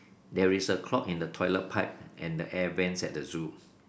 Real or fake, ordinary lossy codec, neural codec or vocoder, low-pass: real; none; none; none